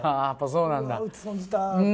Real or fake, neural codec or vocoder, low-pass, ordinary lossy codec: real; none; none; none